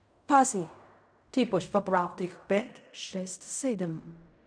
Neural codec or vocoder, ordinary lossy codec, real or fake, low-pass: codec, 16 kHz in and 24 kHz out, 0.4 kbps, LongCat-Audio-Codec, fine tuned four codebook decoder; none; fake; 9.9 kHz